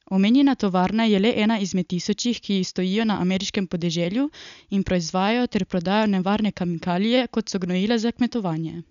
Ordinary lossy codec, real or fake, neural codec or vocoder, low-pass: none; real; none; 7.2 kHz